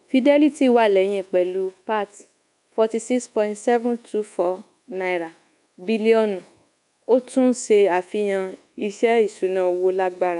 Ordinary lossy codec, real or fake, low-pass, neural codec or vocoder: none; fake; 10.8 kHz; codec, 24 kHz, 1.2 kbps, DualCodec